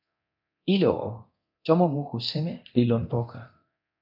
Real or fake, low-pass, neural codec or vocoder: fake; 5.4 kHz; codec, 24 kHz, 0.9 kbps, DualCodec